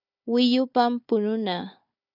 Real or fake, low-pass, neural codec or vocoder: fake; 5.4 kHz; codec, 16 kHz, 4 kbps, FunCodec, trained on Chinese and English, 50 frames a second